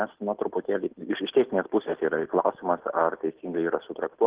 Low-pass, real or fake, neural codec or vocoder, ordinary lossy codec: 3.6 kHz; real; none; Opus, 16 kbps